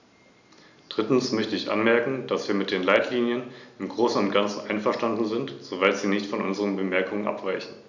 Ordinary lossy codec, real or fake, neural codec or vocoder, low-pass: none; real; none; 7.2 kHz